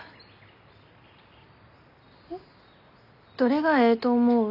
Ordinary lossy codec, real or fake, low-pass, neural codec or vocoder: none; real; 5.4 kHz; none